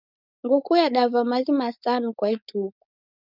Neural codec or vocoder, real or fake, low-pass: codec, 16 kHz, 4.8 kbps, FACodec; fake; 5.4 kHz